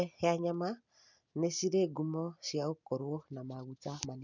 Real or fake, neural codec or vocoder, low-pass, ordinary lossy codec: real; none; 7.2 kHz; none